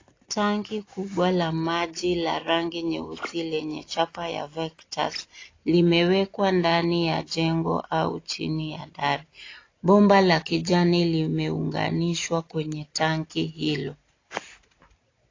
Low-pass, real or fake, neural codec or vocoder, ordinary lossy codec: 7.2 kHz; real; none; AAC, 32 kbps